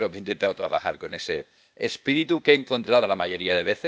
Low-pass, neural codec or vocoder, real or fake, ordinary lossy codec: none; codec, 16 kHz, 0.8 kbps, ZipCodec; fake; none